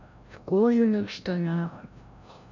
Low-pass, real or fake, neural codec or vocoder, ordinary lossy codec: 7.2 kHz; fake; codec, 16 kHz, 0.5 kbps, FreqCodec, larger model; none